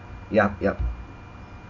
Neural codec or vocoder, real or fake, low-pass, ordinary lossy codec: none; real; 7.2 kHz; none